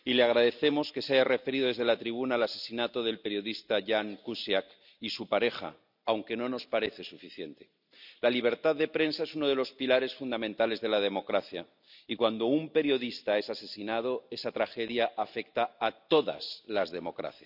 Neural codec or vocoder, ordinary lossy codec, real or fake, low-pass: none; none; real; 5.4 kHz